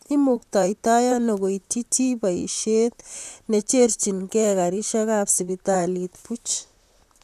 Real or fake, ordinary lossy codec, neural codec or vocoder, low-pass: fake; none; vocoder, 44.1 kHz, 128 mel bands, Pupu-Vocoder; 14.4 kHz